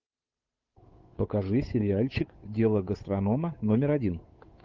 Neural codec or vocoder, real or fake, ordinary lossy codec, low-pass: codec, 16 kHz, 8 kbps, FunCodec, trained on Chinese and English, 25 frames a second; fake; Opus, 32 kbps; 7.2 kHz